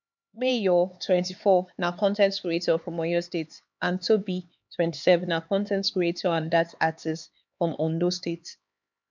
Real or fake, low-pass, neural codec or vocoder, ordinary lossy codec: fake; 7.2 kHz; codec, 16 kHz, 4 kbps, X-Codec, HuBERT features, trained on LibriSpeech; MP3, 64 kbps